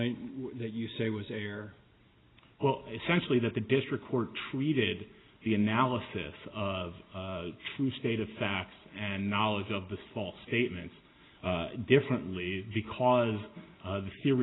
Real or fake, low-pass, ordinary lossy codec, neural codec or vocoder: real; 7.2 kHz; AAC, 16 kbps; none